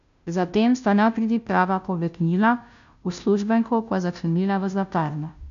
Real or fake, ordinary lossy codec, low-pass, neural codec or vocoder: fake; AAC, 96 kbps; 7.2 kHz; codec, 16 kHz, 0.5 kbps, FunCodec, trained on Chinese and English, 25 frames a second